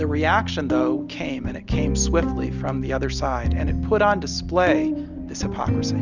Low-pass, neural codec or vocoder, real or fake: 7.2 kHz; none; real